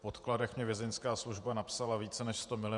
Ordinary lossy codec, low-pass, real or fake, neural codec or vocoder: Opus, 64 kbps; 10.8 kHz; fake; vocoder, 48 kHz, 128 mel bands, Vocos